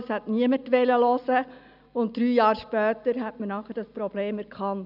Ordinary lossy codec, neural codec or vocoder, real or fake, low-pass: none; none; real; 5.4 kHz